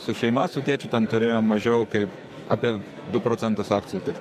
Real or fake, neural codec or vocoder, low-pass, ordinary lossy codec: fake; codec, 44.1 kHz, 2.6 kbps, SNAC; 14.4 kHz; MP3, 64 kbps